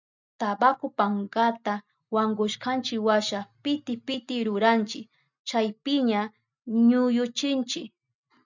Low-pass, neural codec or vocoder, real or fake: 7.2 kHz; none; real